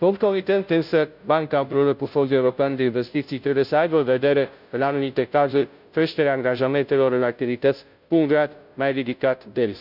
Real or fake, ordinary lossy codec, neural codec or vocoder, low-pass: fake; none; codec, 16 kHz, 0.5 kbps, FunCodec, trained on Chinese and English, 25 frames a second; 5.4 kHz